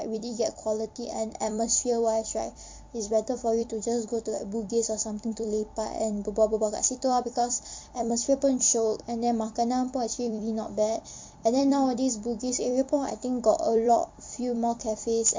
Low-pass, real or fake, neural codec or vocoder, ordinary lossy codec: 7.2 kHz; fake; vocoder, 44.1 kHz, 128 mel bands every 256 samples, BigVGAN v2; MP3, 48 kbps